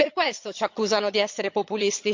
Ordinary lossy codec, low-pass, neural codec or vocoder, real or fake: MP3, 48 kbps; 7.2 kHz; vocoder, 22.05 kHz, 80 mel bands, HiFi-GAN; fake